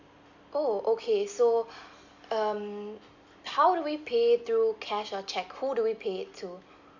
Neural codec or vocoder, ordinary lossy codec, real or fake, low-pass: none; none; real; 7.2 kHz